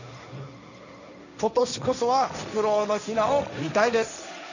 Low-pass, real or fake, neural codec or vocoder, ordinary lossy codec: 7.2 kHz; fake; codec, 16 kHz, 1.1 kbps, Voila-Tokenizer; none